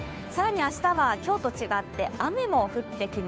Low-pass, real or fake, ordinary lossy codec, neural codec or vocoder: none; fake; none; codec, 16 kHz, 2 kbps, FunCodec, trained on Chinese and English, 25 frames a second